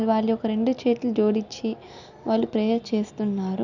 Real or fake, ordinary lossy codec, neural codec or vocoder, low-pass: real; none; none; 7.2 kHz